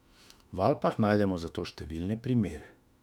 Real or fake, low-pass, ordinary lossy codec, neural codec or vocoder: fake; 19.8 kHz; none; autoencoder, 48 kHz, 32 numbers a frame, DAC-VAE, trained on Japanese speech